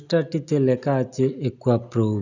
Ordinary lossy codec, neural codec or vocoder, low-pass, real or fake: none; none; 7.2 kHz; real